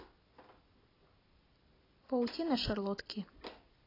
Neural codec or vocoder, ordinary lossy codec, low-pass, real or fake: none; AAC, 24 kbps; 5.4 kHz; real